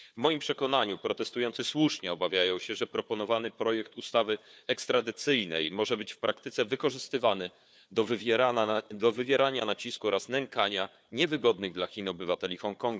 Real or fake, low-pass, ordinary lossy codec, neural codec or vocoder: fake; none; none; codec, 16 kHz, 4 kbps, FunCodec, trained on Chinese and English, 50 frames a second